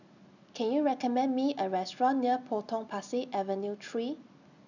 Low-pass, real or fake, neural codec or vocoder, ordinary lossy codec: 7.2 kHz; real; none; none